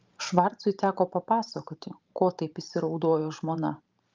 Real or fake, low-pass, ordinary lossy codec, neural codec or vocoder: fake; 7.2 kHz; Opus, 24 kbps; vocoder, 44.1 kHz, 128 mel bands every 512 samples, BigVGAN v2